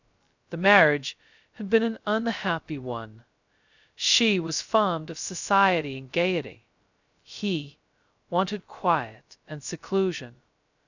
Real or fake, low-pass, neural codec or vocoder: fake; 7.2 kHz; codec, 16 kHz, 0.2 kbps, FocalCodec